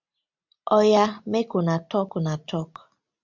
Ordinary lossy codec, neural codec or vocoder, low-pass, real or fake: MP3, 64 kbps; none; 7.2 kHz; real